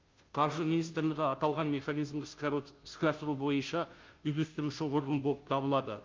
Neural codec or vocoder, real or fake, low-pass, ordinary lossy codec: codec, 16 kHz, 0.5 kbps, FunCodec, trained on Chinese and English, 25 frames a second; fake; 7.2 kHz; Opus, 32 kbps